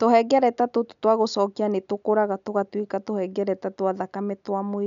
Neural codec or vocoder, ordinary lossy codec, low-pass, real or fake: none; MP3, 96 kbps; 7.2 kHz; real